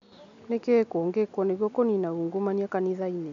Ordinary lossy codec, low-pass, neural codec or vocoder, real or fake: MP3, 64 kbps; 7.2 kHz; none; real